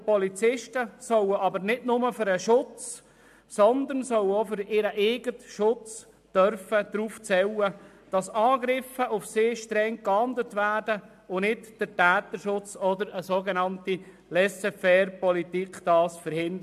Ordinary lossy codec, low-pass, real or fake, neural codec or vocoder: AAC, 96 kbps; 14.4 kHz; real; none